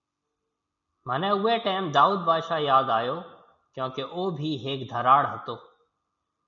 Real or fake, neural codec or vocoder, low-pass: real; none; 7.2 kHz